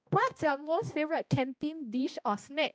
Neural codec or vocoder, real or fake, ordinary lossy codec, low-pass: codec, 16 kHz, 1 kbps, X-Codec, HuBERT features, trained on balanced general audio; fake; none; none